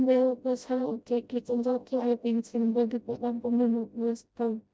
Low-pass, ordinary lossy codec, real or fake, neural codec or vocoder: none; none; fake; codec, 16 kHz, 0.5 kbps, FreqCodec, smaller model